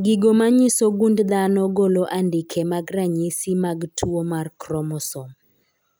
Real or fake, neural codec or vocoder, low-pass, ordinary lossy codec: real; none; none; none